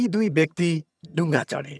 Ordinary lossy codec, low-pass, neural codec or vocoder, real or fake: none; none; vocoder, 22.05 kHz, 80 mel bands, HiFi-GAN; fake